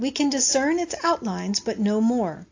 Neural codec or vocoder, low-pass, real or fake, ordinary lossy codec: none; 7.2 kHz; real; AAC, 48 kbps